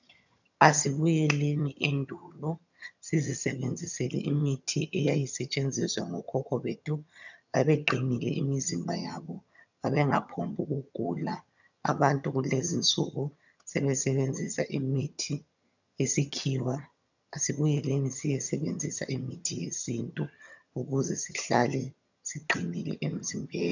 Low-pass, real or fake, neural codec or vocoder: 7.2 kHz; fake; vocoder, 22.05 kHz, 80 mel bands, HiFi-GAN